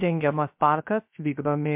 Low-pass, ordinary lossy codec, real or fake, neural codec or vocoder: 3.6 kHz; MP3, 32 kbps; fake; codec, 16 kHz, 0.3 kbps, FocalCodec